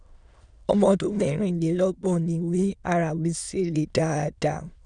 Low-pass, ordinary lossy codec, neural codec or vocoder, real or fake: 9.9 kHz; none; autoencoder, 22.05 kHz, a latent of 192 numbers a frame, VITS, trained on many speakers; fake